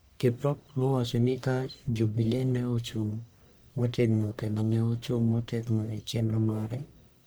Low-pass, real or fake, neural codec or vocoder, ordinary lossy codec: none; fake; codec, 44.1 kHz, 1.7 kbps, Pupu-Codec; none